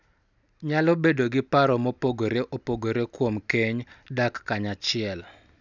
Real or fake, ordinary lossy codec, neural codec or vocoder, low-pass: real; none; none; 7.2 kHz